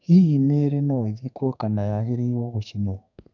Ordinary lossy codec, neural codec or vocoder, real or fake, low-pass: none; codec, 32 kHz, 1.9 kbps, SNAC; fake; 7.2 kHz